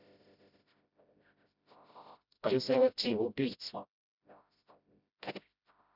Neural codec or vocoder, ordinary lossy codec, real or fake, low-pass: codec, 16 kHz, 0.5 kbps, FreqCodec, smaller model; none; fake; 5.4 kHz